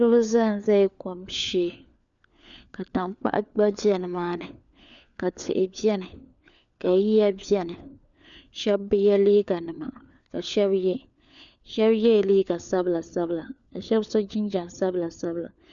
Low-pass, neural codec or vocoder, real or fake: 7.2 kHz; codec, 16 kHz, 4 kbps, FunCodec, trained on LibriTTS, 50 frames a second; fake